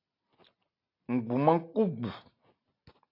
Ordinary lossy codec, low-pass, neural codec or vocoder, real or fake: AAC, 32 kbps; 5.4 kHz; none; real